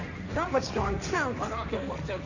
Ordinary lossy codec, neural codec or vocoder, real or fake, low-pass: none; codec, 16 kHz, 1.1 kbps, Voila-Tokenizer; fake; 7.2 kHz